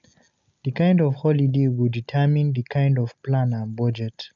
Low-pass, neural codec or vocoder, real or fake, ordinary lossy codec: 7.2 kHz; none; real; none